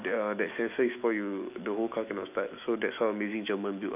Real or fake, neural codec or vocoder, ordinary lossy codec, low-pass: fake; autoencoder, 48 kHz, 128 numbers a frame, DAC-VAE, trained on Japanese speech; none; 3.6 kHz